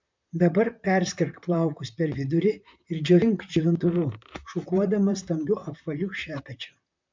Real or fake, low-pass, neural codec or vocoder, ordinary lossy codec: fake; 7.2 kHz; vocoder, 44.1 kHz, 128 mel bands, Pupu-Vocoder; MP3, 64 kbps